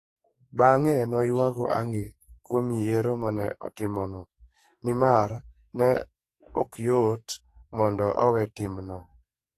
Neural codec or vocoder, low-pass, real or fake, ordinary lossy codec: codec, 44.1 kHz, 2.6 kbps, SNAC; 14.4 kHz; fake; AAC, 48 kbps